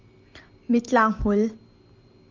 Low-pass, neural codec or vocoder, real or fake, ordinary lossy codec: 7.2 kHz; none; real; Opus, 24 kbps